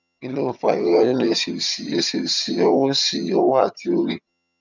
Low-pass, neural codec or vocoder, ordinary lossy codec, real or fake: 7.2 kHz; vocoder, 22.05 kHz, 80 mel bands, HiFi-GAN; none; fake